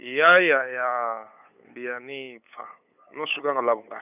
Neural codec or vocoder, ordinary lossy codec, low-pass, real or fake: codec, 16 kHz, 8 kbps, FunCodec, trained on LibriTTS, 25 frames a second; none; 3.6 kHz; fake